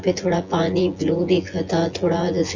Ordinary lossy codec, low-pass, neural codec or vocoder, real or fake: Opus, 32 kbps; 7.2 kHz; vocoder, 24 kHz, 100 mel bands, Vocos; fake